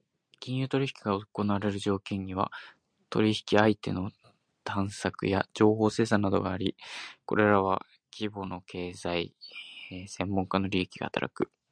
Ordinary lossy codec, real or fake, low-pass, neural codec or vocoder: MP3, 96 kbps; real; 9.9 kHz; none